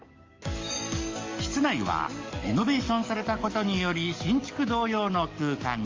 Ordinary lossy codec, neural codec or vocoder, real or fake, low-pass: Opus, 32 kbps; codec, 44.1 kHz, 7.8 kbps, DAC; fake; 7.2 kHz